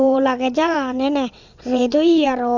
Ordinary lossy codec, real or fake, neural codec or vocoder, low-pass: none; fake; vocoder, 22.05 kHz, 80 mel bands, WaveNeXt; 7.2 kHz